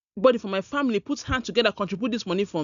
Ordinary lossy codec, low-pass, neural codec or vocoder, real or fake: none; 7.2 kHz; none; real